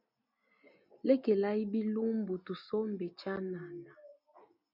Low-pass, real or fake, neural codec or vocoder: 5.4 kHz; real; none